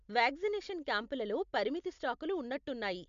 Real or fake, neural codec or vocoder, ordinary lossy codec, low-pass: real; none; MP3, 64 kbps; 7.2 kHz